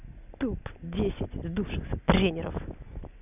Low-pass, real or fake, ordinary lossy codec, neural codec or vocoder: 3.6 kHz; real; none; none